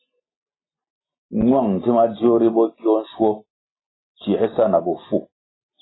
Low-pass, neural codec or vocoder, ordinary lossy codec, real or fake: 7.2 kHz; none; AAC, 16 kbps; real